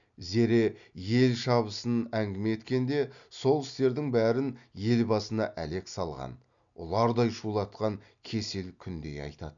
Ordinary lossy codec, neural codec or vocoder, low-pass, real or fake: none; none; 7.2 kHz; real